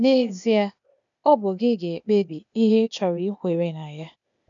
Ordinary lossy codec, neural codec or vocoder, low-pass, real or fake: none; codec, 16 kHz, 0.8 kbps, ZipCodec; 7.2 kHz; fake